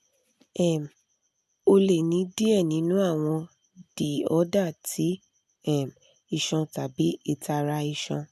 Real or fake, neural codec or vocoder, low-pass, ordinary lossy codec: real; none; 14.4 kHz; none